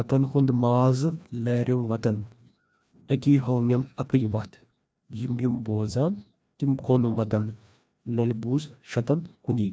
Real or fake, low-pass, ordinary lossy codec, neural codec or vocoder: fake; none; none; codec, 16 kHz, 1 kbps, FreqCodec, larger model